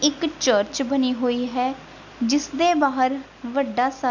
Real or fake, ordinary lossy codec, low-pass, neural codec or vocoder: real; none; 7.2 kHz; none